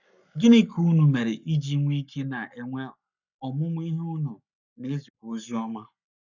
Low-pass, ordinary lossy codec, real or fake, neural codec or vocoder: 7.2 kHz; none; fake; codec, 44.1 kHz, 7.8 kbps, Pupu-Codec